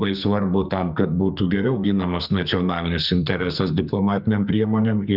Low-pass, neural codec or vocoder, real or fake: 5.4 kHz; codec, 44.1 kHz, 2.6 kbps, SNAC; fake